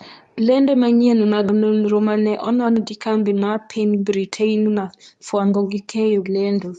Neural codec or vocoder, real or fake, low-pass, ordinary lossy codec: codec, 24 kHz, 0.9 kbps, WavTokenizer, medium speech release version 2; fake; 10.8 kHz; none